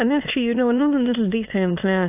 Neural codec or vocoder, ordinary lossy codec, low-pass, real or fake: autoencoder, 22.05 kHz, a latent of 192 numbers a frame, VITS, trained on many speakers; AAC, 32 kbps; 3.6 kHz; fake